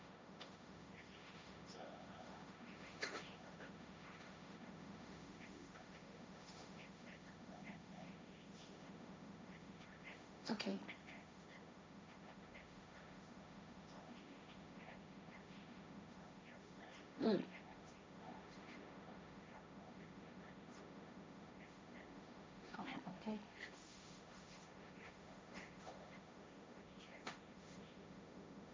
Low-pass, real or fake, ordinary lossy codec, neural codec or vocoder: none; fake; none; codec, 16 kHz, 1.1 kbps, Voila-Tokenizer